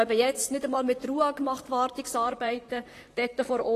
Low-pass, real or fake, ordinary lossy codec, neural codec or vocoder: 14.4 kHz; fake; AAC, 48 kbps; vocoder, 44.1 kHz, 128 mel bands, Pupu-Vocoder